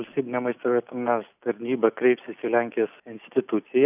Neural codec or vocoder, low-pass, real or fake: none; 3.6 kHz; real